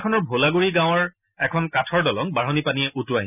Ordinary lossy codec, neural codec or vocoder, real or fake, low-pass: none; none; real; 3.6 kHz